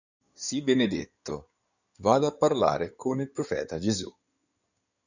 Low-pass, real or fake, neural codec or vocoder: 7.2 kHz; fake; codec, 16 kHz in and 24 kHz out, 2.2 kbps, FireRedTTS-2 codec